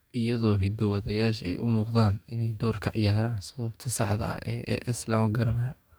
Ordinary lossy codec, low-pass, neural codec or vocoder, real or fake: none; none; codec, 44.1 kHz, 2.6 kbps, DAC; fake